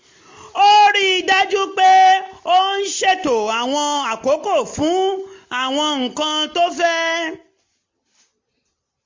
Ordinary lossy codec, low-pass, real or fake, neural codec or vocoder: MP3, 48 kbps; 7.2 kHz; real; none